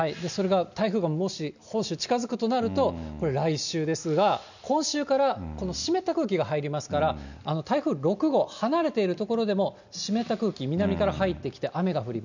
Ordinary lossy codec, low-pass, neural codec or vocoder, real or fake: none; 7.2 kHz; none; real